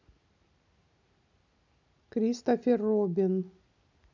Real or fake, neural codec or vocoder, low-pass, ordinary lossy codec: real; none; 7.2 kHz; none